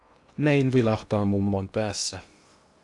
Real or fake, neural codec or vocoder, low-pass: fake; codec, 16 kHz in and 24 kHz out, 0.8 kbps, FocalCodec, streaming, 65536 codes; 10.8 kHz